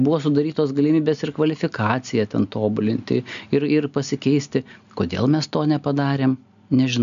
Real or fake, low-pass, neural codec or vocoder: real; 7.2 kHz; none